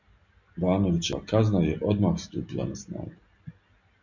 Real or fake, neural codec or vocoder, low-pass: real; none; 7.2 kHz